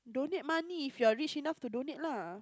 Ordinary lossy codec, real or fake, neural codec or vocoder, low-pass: none; real; none; none